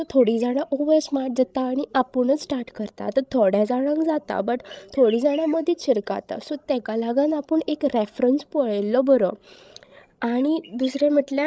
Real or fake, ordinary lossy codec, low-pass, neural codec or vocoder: fake; none; none; codec, 16 kHz, 16 kbps, FreqCodec, larger model